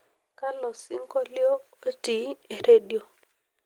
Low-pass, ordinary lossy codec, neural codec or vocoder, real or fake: 19.8 kHz; Opus, 24 kbps; none; real